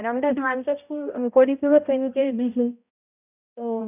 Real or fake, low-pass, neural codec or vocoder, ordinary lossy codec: fake; 3.6 kHz; codec, 16 kHz, 0.5 kbps, X-Codec, HuBERT features, trained on balanced general audio; none